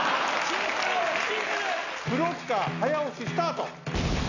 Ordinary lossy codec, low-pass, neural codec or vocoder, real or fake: none; 7.2 kHz; none; real